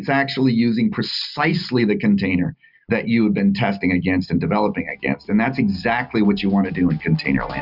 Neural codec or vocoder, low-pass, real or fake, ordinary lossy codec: none; 5.4 kHz; real; Opus, 64 kbps